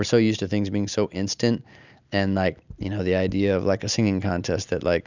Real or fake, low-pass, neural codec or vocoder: real; 7.2 kHz; none